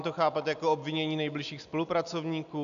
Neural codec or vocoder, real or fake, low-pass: none; real; 7.2 kHz